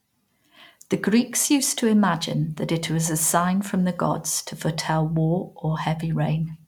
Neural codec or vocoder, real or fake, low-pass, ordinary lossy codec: none; real; 19.8 kHz; none